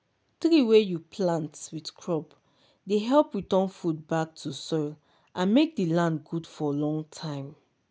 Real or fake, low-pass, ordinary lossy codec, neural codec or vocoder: real; none; none; none